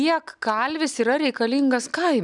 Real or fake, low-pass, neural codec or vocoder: real; 10.8 kHz; none